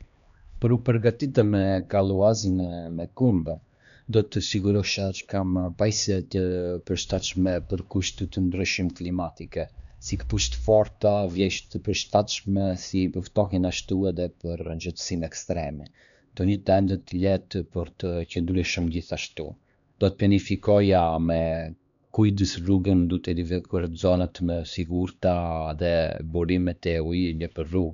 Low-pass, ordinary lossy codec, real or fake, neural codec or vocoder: 7.2 kHz; none; fake; codec, 16 kHz, 2 kbps, X-Codec, HuBERT features, trained on LibriSpeech